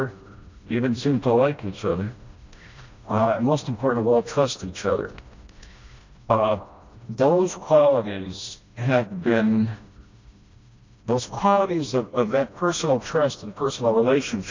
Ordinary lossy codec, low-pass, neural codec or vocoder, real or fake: MP3, 64 kbps; 7.2 kHz; codec, 16 kHz, 1 kbps, FreqCodec, smaller model; fake